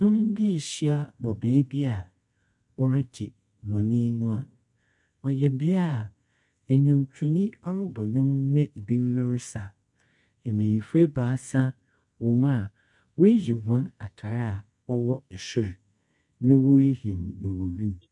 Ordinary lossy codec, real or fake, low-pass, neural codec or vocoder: MP3, 64 kbps; fake; 10.8 kHz; codec, 24 kHz, 0.9 kbps, WavTokenizer, medium music audio release